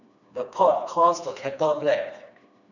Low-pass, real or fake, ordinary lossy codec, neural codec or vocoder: 7.2 kHz; fake; none; codec, 16 kHz, 2 kbps, FreqCodec, smaller model